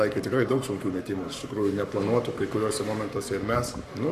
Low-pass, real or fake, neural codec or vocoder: 14.4 kHz; fake; codec, 44.1 kHz, 7.8 kbps, Pupu-Codec